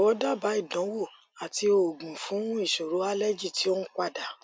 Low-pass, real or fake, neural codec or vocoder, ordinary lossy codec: none; real; none; none